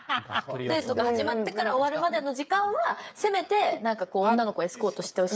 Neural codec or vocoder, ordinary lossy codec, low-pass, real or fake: codec, 16 kHz, 8 kbps, FreqCodec, smaller model; none; none; fake